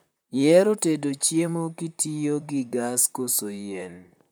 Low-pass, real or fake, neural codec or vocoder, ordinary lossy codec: none; fake; vocoder, 44.1 kHz, 128 mel bands, Pupu-Vocoder; none